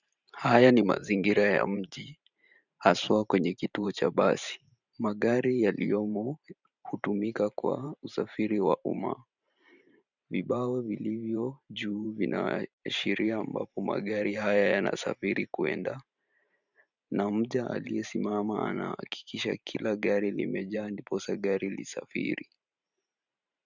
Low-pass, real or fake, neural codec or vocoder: 7.2 kHz; fake; vocoder, 44.1 kHz, 128 mel bands every 512 samples, BigVGAN v2